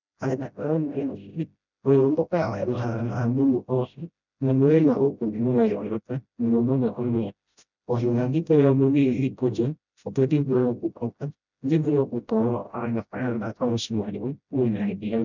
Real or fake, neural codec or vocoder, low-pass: fake; codec, 16 kHz, 0.5 kbps, FreqCodec, smaller model; 7.2 kHz